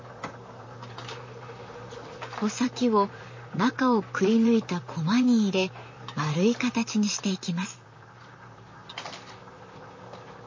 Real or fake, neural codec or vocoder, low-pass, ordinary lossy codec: fake; vocoder, 44.1 kHz, 128 mel bands, Pupu-Vocoder; 7.2 kHz; MP3, 32 kbps